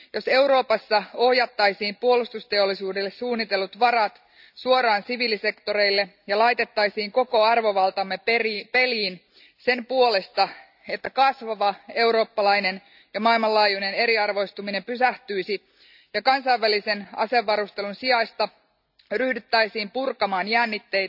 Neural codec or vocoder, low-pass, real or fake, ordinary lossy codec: none; 5.4 kHz; real; none